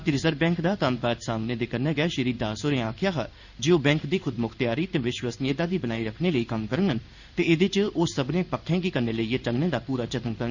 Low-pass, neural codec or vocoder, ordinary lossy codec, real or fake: 7.2 kHz; codec, 16 kHz in and 24 kHz out, 1 kbps, XY-Tokenizer; none; fake